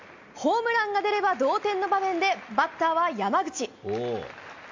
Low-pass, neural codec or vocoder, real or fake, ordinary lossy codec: 7.2 kHz; none; real; MP3, 48 kbps